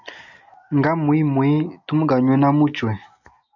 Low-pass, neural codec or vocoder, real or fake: 7.2 kHz; none; real